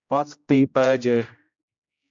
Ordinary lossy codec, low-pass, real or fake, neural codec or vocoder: MP3, 64 kbps; 7.2 kHz; fake; codec, 16 kHz, 0.5 kbps, X-Codec, HuBERT features, trained on general audio